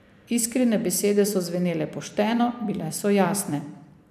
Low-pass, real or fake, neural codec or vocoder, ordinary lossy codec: 14.4 kHz; fake; vocoder, 44.1 kHz, 128 mel bands every 256 samples, BigVGAN v2; none